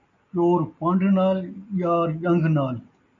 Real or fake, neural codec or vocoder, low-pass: real; none; 7.2 kHz